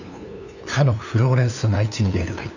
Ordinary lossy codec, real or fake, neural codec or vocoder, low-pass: none; fake; codec, 16 kHz, 2 kbps, FunCodec, trained on LibriTTS, 25 frames a second; 7.2 kHz